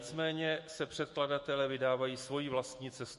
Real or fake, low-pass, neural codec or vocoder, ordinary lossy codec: fake; 14.4 kHz; autoencoder, 48 kHz, 128 numbers a frame, DAC-VAE, trained on Japanese speech; MP3, 48 kbps